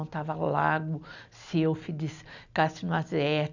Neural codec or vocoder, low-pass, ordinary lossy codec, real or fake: none; 7.2 kHz; none; real